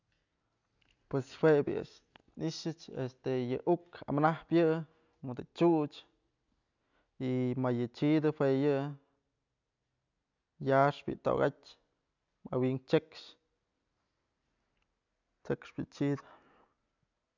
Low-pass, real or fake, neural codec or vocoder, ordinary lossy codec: 7.2 kHz; real; none; none